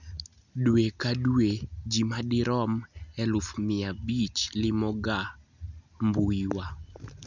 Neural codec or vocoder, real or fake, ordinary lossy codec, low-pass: none; real; none; 7.2 kHz